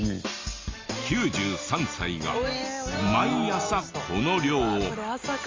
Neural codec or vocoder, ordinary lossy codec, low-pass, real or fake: none; Opus, 32 kbps; 7.2 kHz; real